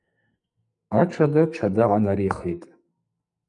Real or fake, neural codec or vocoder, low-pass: fake; codec, 44.1 kHz, 2.6 kbps, SNAC; 10.8 kHz